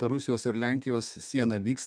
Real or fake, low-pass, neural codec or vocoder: fake; 9.9 kHz; codec, 32 kHz, 1.9 kbps, SNAC